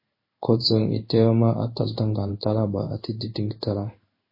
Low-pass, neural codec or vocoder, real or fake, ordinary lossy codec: 5.4 kHz; codec, 16 kHz in and 24 kHz out, 1 kbps, XY-Tokenizer; fake; MP3, 24 kbps